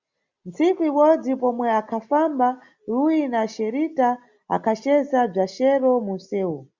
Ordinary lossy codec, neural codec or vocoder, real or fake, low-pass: Opus, 64 kbps; none; real; 7.2 kHz